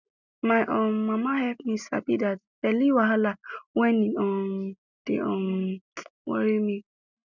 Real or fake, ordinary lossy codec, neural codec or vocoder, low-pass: real; none; none; 7.2 kHz